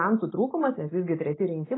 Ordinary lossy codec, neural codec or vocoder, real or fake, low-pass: AAC, 16 kbps; none; real; 7.2 kHz